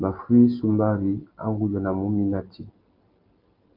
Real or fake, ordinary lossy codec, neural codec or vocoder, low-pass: real; Opus, 32 kbps; none; 5.4 kHz